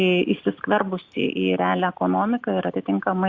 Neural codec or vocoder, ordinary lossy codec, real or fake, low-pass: none; Opus, 64 kbps; real; 7.2 kHz